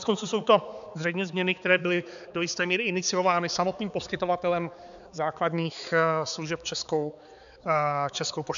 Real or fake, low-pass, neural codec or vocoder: fake; 7.2 kHz; codec, 16 kHz, 4 kbps, X-Codec, HuBERT features, trained on balanced general audio